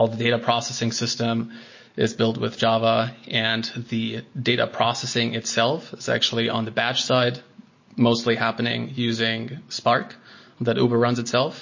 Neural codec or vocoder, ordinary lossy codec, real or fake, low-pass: none; MP3, 32 kbps; real; 7.2 kHz